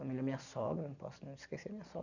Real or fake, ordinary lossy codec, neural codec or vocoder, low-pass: real; none; none; 7.2 kHz